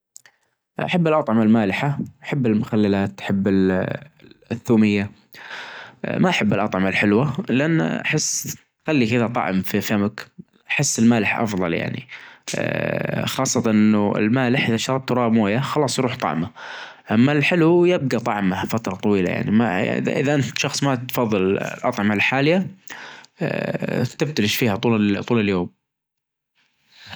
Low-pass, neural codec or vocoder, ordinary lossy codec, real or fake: none; none; none; real